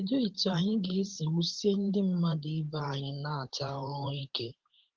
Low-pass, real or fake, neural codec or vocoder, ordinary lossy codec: 7.2 kHz; fake; vocoder, 44.1 kHz, 128 mel bands every 512 samples, BigVGAN v2; Opus, 16 kbps